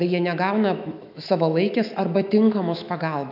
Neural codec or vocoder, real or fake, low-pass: autoencoder, 48 kHz, 128 numbers a frame, DAC-VAE, trained on Japanese speech; fake; 5.4 kHz